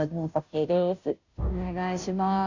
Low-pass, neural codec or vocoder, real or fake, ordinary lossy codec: 7.2 kHz; codec, 16 kHz, 0.5 kbps, FunCodec, trained on Chinese and English, 25 frames a second; fake; none